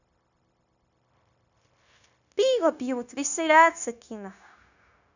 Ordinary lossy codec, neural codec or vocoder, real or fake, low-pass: none; codec, 16 kHz, 0.9 kbps, LongCat-Audio-Codec; fake; 7.2 kHz